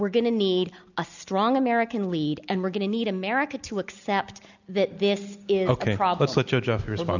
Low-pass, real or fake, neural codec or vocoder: 7.2 kHz; real; none